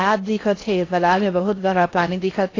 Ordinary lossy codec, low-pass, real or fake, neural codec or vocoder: AAC, 32 kbps; 7.2 kHz; fake; codec, 16 kHz in and 24 kHz out, 0.6 kbps, FocalCodec, streaming, 4096 codes